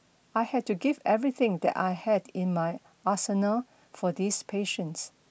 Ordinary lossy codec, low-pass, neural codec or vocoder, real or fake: none; none; none; real